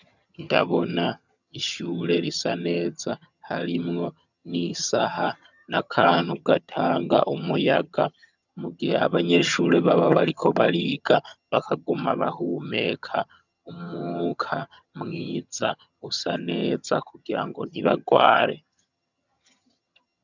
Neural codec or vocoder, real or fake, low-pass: vocoder, 22.05 kHz, 80 mel bands, HiFi-GAN; fake; 7.2 kHz